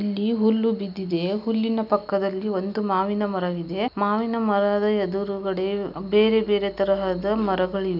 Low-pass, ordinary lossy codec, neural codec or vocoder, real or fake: 5.4 kHz; none; none; real